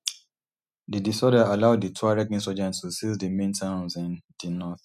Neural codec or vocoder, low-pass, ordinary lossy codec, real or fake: none; 14.4 kHz; none; real